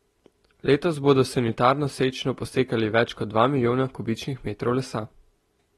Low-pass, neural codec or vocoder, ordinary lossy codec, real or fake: 19.8 kHz; none; AAC, 32 kbps; real